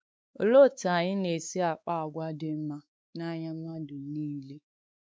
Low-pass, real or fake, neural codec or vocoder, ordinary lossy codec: none; fake; codec, 16 kHz, 4 kbps, X-Codec, WavLM features, trained on Multilingual LibriSpeech; none